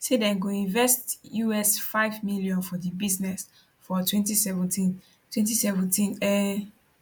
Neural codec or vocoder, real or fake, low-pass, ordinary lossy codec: none; real; 19.8 kHz; MP3, 96 kbps